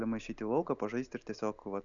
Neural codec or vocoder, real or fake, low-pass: none; real; 7.2 kHz